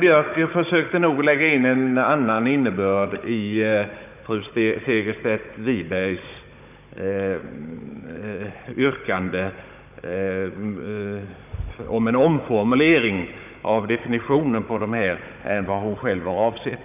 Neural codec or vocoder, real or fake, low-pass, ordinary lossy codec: codec, 16 kHz, 16 kbps, FunCodec, trained on Chinese and English, 50 frames a second; fake; 3.6 kHz; none